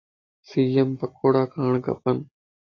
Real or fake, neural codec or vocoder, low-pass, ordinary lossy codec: real; none; 7.2 kHz; AAC, 32 kbps